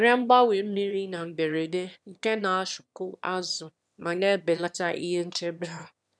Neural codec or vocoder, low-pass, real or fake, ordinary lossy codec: autoencoder, 22.05 kHz, a latent of 192 numbers a frame, VITS, trained on one speaker; none; fake; none